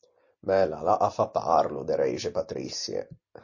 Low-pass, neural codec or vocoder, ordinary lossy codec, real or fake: 7.2 kHz; none; MP3, 32 kbps; real